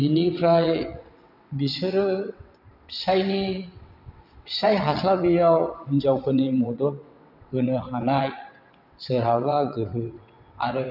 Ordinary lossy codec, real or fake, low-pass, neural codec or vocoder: none; fake; 5.4 kHz; vocoder, 22.05 kHz, 80 mel bands, WaveNeXt